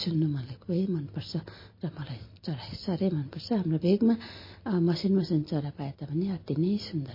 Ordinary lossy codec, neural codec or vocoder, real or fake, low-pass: MP3, 24 kbps; none; real; 5.4 kHz